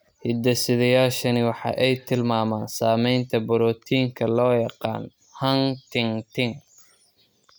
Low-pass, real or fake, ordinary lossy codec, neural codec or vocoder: none; real; none; none